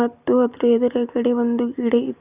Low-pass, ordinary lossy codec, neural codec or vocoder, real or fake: 3.6 kHz; Opus, 64 kbps; none; real